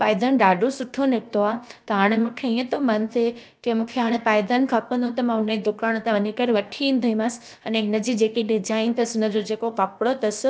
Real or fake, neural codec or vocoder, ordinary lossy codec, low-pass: fake; codec, 16 kHz, about 1 kbps, DyCAST, with the encoder's durations; none; none